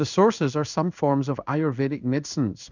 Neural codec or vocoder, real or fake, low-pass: codec, 16 kHz in and 24 kHz out, 1 kbps, XY-Tokenizer; fake; 7.2 kHz